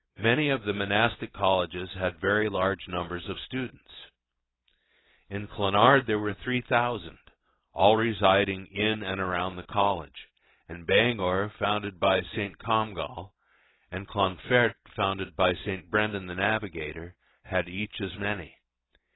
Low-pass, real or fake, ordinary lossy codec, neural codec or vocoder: 7.2 kHz; real; AAC, 16 kbps; none